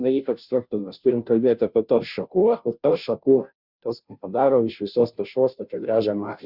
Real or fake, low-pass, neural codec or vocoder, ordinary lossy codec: fake; 5.4 kHz; codec, 16 kHz, 0.5 kbps, FunCodec, trained on Chinese and English, 25 frames a second; Opus, 64 kbps